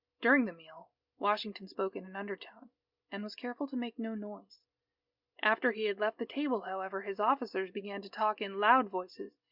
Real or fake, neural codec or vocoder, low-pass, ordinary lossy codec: real; none; 5.4 kHz; Opus, 64 kbps